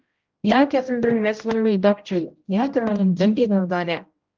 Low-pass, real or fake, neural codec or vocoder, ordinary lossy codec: 7.2 kHz; fake; codec, 16 kHz, 0.5 kbps, X-Codec, HuBERT features, trained on general audio; Opus, 24 kbps